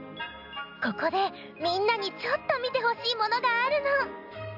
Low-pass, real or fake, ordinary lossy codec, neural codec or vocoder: 5.4 kHz; real; AAC, 48 kbps; none